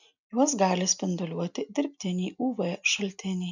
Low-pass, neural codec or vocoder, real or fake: 7.2 kHz; none; real